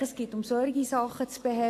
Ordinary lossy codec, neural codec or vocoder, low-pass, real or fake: AAC, 64 kbps; none; 14.4 kHz; real